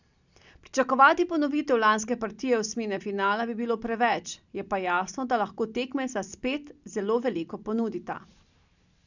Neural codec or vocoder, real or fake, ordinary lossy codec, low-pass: none; real; none; 7.2 kHz